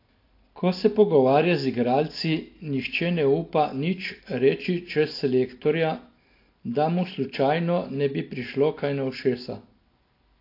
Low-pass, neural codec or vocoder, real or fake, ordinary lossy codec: 5.4 kHz; none; real; AAC, 32 kbps